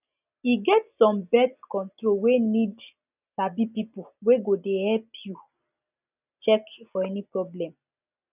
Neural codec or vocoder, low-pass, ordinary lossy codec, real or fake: none; 3.6 kHz; none; real